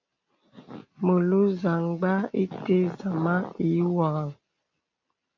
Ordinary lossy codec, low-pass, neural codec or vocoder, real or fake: AAC, 48 kbps; 7.2 kHz; none; real